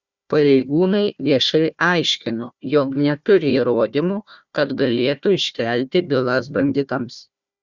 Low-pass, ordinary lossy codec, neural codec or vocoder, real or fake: 7.2 kHz; Opus, 64 kbps; codec, 16 kHz, 1 kbps, FunCodec, trained on Chinese and English, 50 frames a second; fake